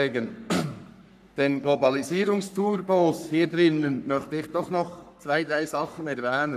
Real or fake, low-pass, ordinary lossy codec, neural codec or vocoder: fake; 14.4 kHz; none; codec, 44.1 kHz, 3.4 kbps, Pupu-Codec